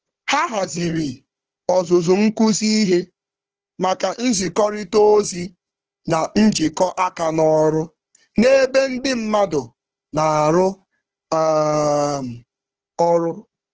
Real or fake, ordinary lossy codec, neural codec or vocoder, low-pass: fake; Opus, 16 kbps; codec, 16 kHz, 4 kbps, FunCodec, trained on Chinese and English, 50 frames a second; 7.2 kHz